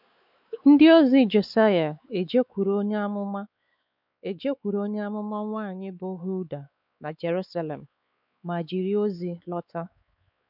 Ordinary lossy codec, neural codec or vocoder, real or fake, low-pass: none; codec, 16 kHz, 4 kbps, X-Codec, WavLM features, trained on Multilingual LibriSpeech; fake; 5.4 kHz